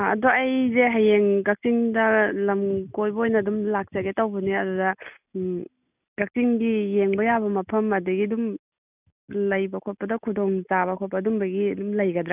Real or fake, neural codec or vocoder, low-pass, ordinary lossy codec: real; none; 3.6 kHz; none